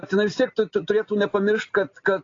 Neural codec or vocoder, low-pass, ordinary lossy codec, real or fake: none; 7.2 kHz; AAC, 32 kbps; real